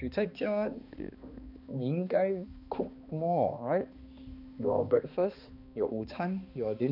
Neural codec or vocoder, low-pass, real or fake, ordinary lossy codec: codec, 16 kHz, 2 kbps, X-Codec, HuBERT features, trained on balanced general audio; 5.4 kHz; fake; none